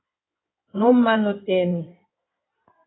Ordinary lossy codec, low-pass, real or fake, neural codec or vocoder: AAC, 16 kbps; 7.2 kHz; fake; codec, 16 kHz in and 24 kHz out, 2.2 kbps, FireRedTTS-2 codec